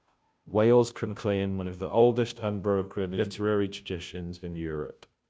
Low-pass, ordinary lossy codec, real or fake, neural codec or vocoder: none; none; fake; codec, 16 kHz, 0.5 kbps, FunCodec, trained on Chinese and English, 25 frames a second